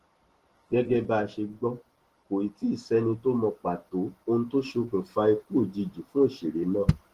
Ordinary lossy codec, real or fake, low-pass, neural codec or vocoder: Opus, 16 kbps; real; 14.4 kHz; none